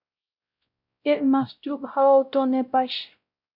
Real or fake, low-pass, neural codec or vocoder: fake; 5.4 kHz; codec, 16 kHz, 0.5 kbps, X-Codec, WavLM features, trained on Multilingual LibriSpeech